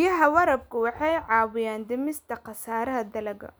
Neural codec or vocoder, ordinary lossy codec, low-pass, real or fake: none; none; none; real